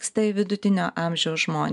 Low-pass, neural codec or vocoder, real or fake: 10.8 kHz; none; real